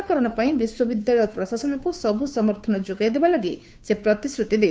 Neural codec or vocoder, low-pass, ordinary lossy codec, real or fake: codec, 16 kHz, 2 kbps, FunCodec, trained on Chinese and English, 25 frames a second; none; none; fake